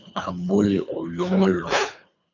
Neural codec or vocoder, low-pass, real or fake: codec, 24 kHz, 3 kbps, HILCodec; 7.2 kHz; fake